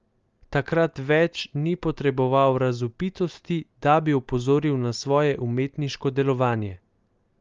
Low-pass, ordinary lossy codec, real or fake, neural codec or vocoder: 7.2 kHz; Opus, 32 kbps; real; none